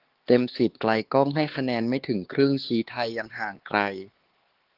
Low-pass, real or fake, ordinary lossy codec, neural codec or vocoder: 5.4 kHz; fake; Opus, 16 kbps; codec, 16 kHz, 4 kbps, X-Codec, HuBERT features, trained on LibriSpeech